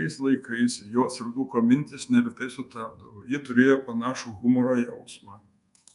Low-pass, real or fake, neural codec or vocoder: 10.8 kHz; fake; codec, 24 kHz, 1.2 kbps, DualCodec